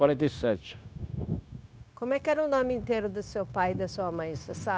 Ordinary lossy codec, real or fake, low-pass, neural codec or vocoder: none; fake; none; codec, 16 kHz, 0.9 kbps, LongCat-Audio-Codec